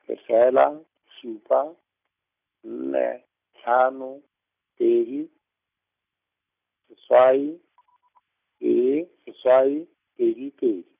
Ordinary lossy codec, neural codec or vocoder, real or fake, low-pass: none; none; real; 3.6 kHz